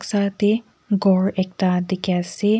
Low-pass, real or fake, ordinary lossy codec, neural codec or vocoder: none; real; none; none